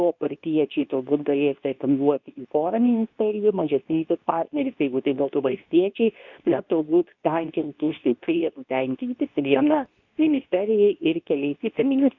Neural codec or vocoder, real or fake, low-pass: codec, 24 kHz, 0.9 kbps, WavTokenizer, medium speech release version 2; fake; 7.2 kHz